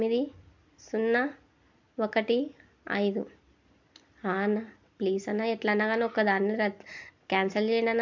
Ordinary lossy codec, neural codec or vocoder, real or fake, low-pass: MP3, 64 kbps; none; real; 7.2 kHz